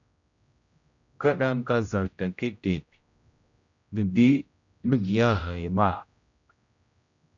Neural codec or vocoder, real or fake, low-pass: codec, 16 kHz, 0.5 kbps, X-Codec, HuBERT features, trained on general audio; fake; 7.2 kHz